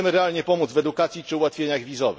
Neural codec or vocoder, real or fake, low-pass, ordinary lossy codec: none; real; none; none